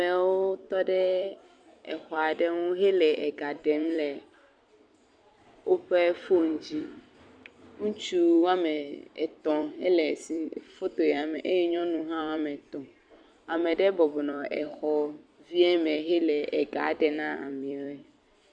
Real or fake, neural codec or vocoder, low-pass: fake; vocoder, 44.1 kHz, 128 mel bands every 256 samples, BigVGAN v2; 9.9 kHz